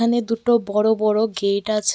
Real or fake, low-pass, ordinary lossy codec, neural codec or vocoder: real; none; none; none